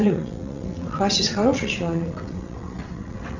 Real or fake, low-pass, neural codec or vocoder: fake; 7.2 kHz; vocoder, 22.05 kHz, 80 mel bands, WaveNeXt